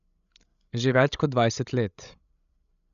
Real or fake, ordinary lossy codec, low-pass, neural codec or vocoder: fake; none; 7.2 kHz; codec, 16 kHz, 16 kbps, FreqCodec, larger model